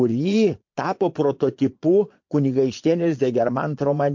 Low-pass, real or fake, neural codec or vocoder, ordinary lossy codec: 7.2 kHz; fake; vocoder, 22.05 kHz, 80 mel bands, Vocos; MP3, 48 kbps